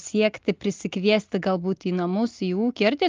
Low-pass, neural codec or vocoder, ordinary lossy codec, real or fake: 7.2 kHz; none; Opus, 32 kbps; real